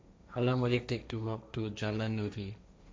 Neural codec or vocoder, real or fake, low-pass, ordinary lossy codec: codec, 16 kHz, 1.1 kbps, Voila-Tokenizer; fake; none; none